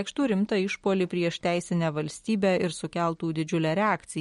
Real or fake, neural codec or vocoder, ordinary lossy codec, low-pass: real; none; MP3, 48 kbps; 19.8 kHz